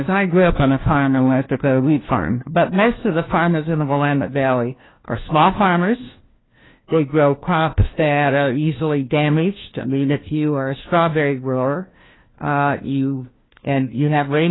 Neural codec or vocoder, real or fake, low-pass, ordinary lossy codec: codec, 16 kHz, 1 kbps, FunCodec, trained on LibriTTS, 50 frames a second; fake; 7.2 kHz; AAC, 16 kbps